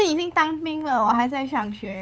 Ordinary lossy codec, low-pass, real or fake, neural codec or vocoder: none; none; fake; codec, 16 kHz, 8 kbps, FunCodec, trained on LibriTTS, 25 frames a second